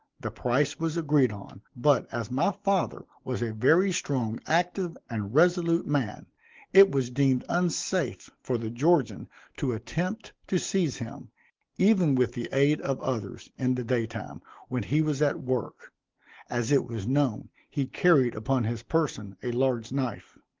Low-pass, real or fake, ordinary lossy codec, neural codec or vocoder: 7.2 kHz; real; Opus, 16 kbps; none